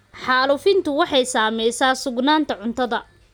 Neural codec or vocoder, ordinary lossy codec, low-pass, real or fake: none; none; none; real